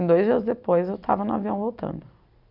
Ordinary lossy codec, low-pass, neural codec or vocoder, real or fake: none; 5.4 kHz; vocoder, 44.1 kHz, 128 mel bands every 256 samples, BigVGAN v2; fake